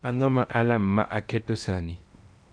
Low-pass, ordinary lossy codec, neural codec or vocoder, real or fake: 9.9 kHz; none; codec, 16 kHz in and 24 kHz out, 0.8 kbps, FocalCodec, streaming, 65536 codes; fake